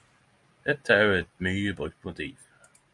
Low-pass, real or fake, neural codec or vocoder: 10.8 kHz; real; none